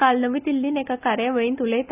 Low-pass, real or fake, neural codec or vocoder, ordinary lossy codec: 3.6 kHz; real; none; none